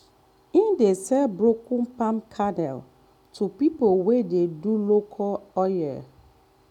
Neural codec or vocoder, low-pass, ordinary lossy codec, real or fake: none; 19.8 kHz; none; real